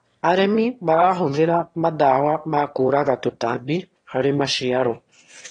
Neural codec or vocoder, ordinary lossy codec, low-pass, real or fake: autoencoder, 22.05 kHz, a latent of 192 numbers a frame, VITS, trained on one speaker; AAC, 32 kbps; 9.9 kHz; fake